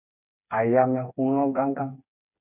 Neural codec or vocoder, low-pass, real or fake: codec, 16 kHz, 4 kbps, FreqCodec, smaller model; 3.6 kHz; fake